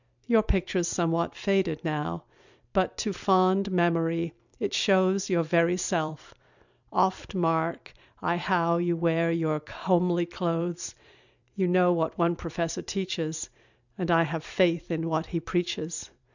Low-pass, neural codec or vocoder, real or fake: 7.2 kHz; vocoder, 44.1 kHz, 128 mel bands every 256 samples, BigVGAN v2; fake